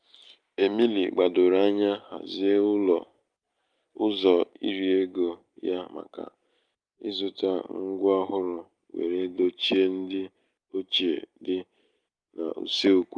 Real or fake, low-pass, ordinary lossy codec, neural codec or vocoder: real; 9.9 kHz; Opus, 32 kbps; none